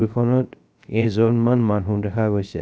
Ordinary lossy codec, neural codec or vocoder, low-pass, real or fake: none; codec, 16 kHz, 0.3 kbps, FocalCodec; none; fake